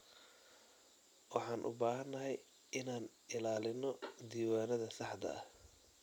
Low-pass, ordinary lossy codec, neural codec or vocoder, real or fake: 19.8 kHz; none; none; real